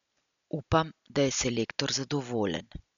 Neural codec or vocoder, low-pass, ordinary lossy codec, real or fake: none; 7.2 kHz; none; real